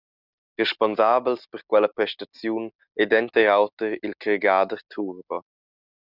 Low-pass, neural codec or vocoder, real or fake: 5.4 kHz; none; real